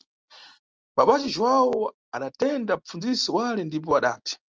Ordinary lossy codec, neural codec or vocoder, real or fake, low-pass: Opus, 24 kbps; none; real; 7.2 kHz